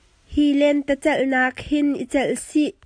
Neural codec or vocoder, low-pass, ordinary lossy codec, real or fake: none; 9.9 kHz; AAC, 64 kbps; real